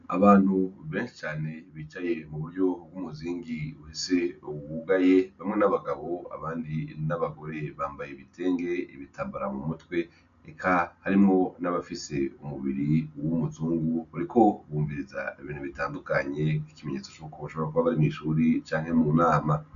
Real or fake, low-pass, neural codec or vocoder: real; 7.2 kHz; none